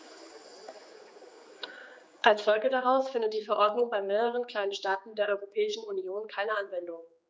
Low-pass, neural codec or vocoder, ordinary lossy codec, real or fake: none; codec, 16 kHz, 4 kbps, X-Codec, HuBERT features, trained on general audio; none; fake